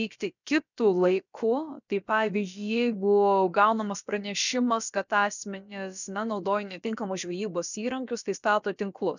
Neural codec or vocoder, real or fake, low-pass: codec, 16 kHz, about 1 kbps, DyCAST, with the encoder's durations; fake; 7.2 kHz